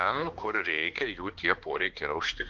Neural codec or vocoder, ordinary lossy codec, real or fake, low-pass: codec, 16 kHz, 2 kbps, X-Codec, HuBERT features, trained on balanced general audio; Opus, 16 kbps; fake; 7.2 kHz